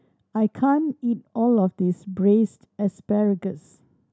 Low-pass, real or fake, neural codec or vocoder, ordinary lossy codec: none; real; none; none